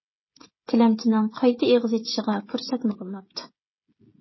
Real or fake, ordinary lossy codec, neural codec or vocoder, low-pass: fake; MP3, 24 kbps; codec, 16 kHz, 16 kbps, FreqCodec, smaller model; 7.2 kHz